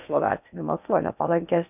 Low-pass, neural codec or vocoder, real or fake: 3.6 kHz; codec, 16 kHz in and 24 kHz out, 0.8 kbps, FocalCodec, streaming, 65536 codes; fake